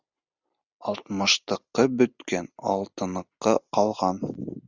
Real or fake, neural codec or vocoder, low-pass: real; none; 7.2 kHz